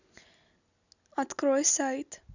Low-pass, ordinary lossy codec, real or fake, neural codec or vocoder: 7.2 kHz; none; real; none